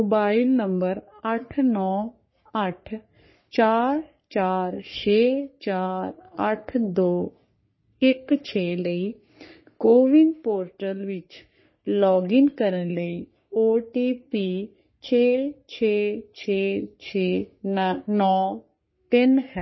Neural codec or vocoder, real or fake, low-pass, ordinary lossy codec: codec, 44.1 kHz, 3.4 kbps, Pupu-Codec; fake; 7.2 kHz; MP3, 24 kbps